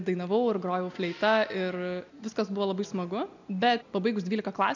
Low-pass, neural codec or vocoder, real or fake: 7.2 kHz; none; real